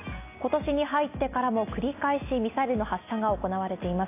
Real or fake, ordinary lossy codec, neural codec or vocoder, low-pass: real; AAC, 24 kbps; none; 3.6 kHz